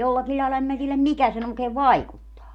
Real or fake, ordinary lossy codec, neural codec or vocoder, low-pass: real; none; none; 19.8 kHz